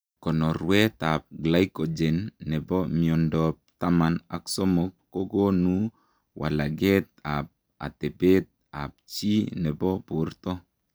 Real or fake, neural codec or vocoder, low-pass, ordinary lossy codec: real; none; none; none